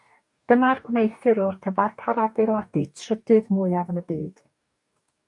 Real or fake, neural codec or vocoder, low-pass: fake; codec, 44.1 kHz, 2.6 kbps, DAC; 10.8 kHz